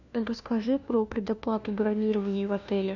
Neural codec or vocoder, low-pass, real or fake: codec, 16 kHz, 1 kbps, FunCodec, trained on LibriTTS, 50 frames a second; 7.2 kHz; fake